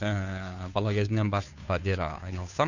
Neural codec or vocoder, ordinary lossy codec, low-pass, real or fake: codec, 16 kHz, 2 kbps, FunCodec, trained on Chinese and English, 25 frames a second; none; 7.2 kHz; fake